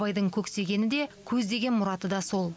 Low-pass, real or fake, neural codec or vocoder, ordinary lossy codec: none; real; none; none